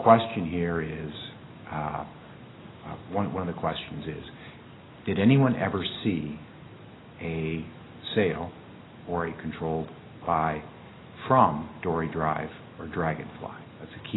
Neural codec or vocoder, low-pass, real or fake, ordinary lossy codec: none; 7.2 kHz; real; AAC, 16 kbps